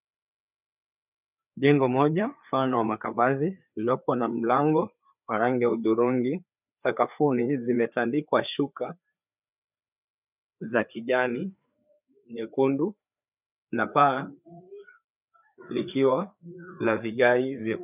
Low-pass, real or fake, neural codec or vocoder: 3.6 kHz; fake; codec, 16 kHz, 4 kbps, FreqCodec, larger model